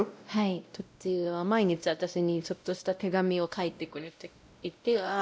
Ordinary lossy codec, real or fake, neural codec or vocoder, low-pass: none; fake; codec, 16 kHz, 0.5 kbps, X-Codec, WavLM features, trained on Multilingual LibriSpeech; none